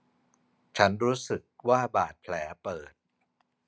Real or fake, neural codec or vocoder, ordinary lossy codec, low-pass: real; none; none; none